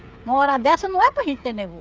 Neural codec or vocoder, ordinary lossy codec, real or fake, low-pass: codec, 16 kHz, 8 kbps, FreqCodec, smaller model; none; fake; none